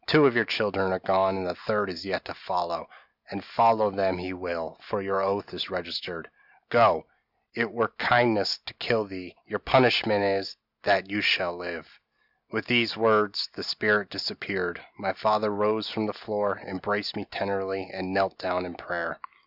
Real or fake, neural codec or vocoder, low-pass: real; none; 5.4 kHz